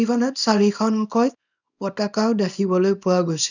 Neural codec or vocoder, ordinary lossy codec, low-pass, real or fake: codec, 24 kHz, 0.9 kbps, WavTokenizer, small release; none; 7.2 kHz; fake